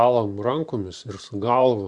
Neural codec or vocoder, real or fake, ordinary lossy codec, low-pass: vocoder, 44.1 kHz, 128 mel bands every 512 samples, BigVGAN v2; fake; Opus, 64 kbps; 10.8 kHz